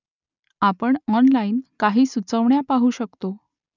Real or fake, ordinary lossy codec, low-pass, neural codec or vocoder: real; none; 7.2 kHz; none